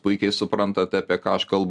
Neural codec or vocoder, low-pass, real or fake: none; 10.8 kHz; real